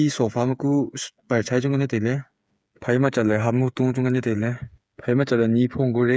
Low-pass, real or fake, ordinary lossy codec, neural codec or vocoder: none; fake; none; codec, 16 kHz, 8 kbps, FreqCodec, smaller model